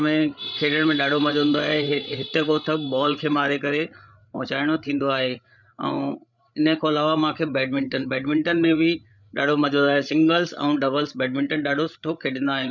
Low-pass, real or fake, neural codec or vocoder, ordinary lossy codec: 7.2 kHz; fake; codec, 16 kHz, 8 kbps, FreqCodec, larger model; none